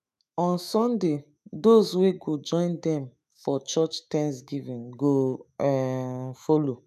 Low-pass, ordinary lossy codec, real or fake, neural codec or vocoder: 14.4 kHz; none; fake; autoencoder, 48 kHz, 128 numbers a frame, DAC-VAE, trained on Japanese speech